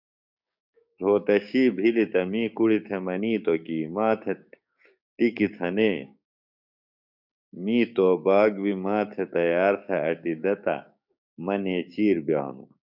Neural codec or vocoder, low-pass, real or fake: codec, 16 kHz, 6 kbps, DAC; 5.4 kHz; fake